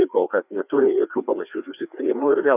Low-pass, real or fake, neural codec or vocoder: 3.6 kHz; fake; codec, 16 kHz, 2 kbps, FreqCodec, larger model